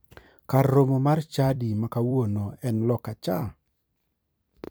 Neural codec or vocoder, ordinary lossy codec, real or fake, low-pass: vocoder, 44.1 kHz, 128 mel bands every 256 samples, BigVGAN v2; none; fake; none